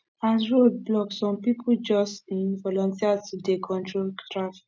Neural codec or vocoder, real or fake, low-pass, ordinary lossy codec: none; real; none; none